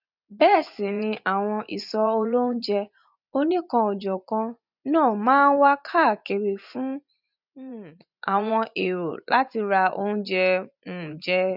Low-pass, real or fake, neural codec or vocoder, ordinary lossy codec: 5.4 kHz; fake; vocoder, 24 kHz, 100 mel bands, Vocos; none